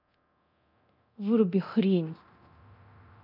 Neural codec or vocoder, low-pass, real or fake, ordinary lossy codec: codec, 24 kHz, 0.9 kbps, DualCodec; 5.4 kHz; fake; none